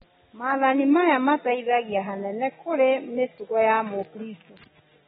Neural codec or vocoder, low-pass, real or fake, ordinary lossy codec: none; 19.8 kHz; real; AAC, 16 kbps